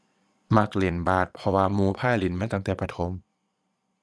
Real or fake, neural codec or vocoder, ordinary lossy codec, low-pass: fake; vocoder, 22.05 kHz, 80 mel bands, Vocos; none; none